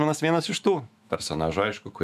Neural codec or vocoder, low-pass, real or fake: autoencoder, 48 kHz, 128 numbers a frame, DAC-VAE, trained on Japanese speech; 14.4 kHz; fake